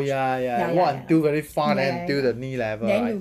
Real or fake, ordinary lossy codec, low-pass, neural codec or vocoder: real; none; 14.4 kHz; none